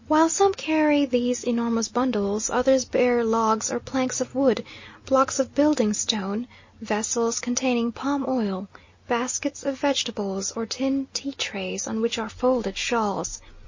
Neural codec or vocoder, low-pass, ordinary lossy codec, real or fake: none; 7.2 kHz; MP3, 32 kbps; real